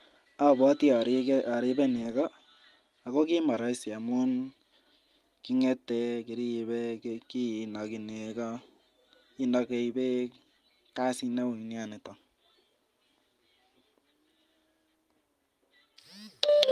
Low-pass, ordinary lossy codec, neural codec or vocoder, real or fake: 14.4 kHz; Opus, 32 kbps; none; real